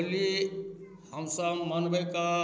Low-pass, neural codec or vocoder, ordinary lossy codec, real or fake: none; none; none; real